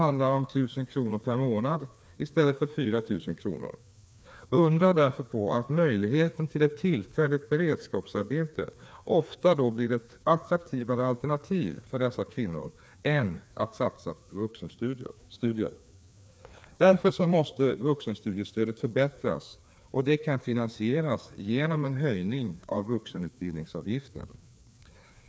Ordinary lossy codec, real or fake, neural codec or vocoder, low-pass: none; fake; codec, 16 kHz, 2 kbps, FreqCodec, larger model; none